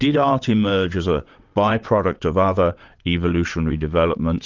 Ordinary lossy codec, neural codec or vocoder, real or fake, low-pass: Opus, 24 kbps; vocoder, 22.05 kHz, 80 mel bands, WaveNeXt; fake; 7.2 kHz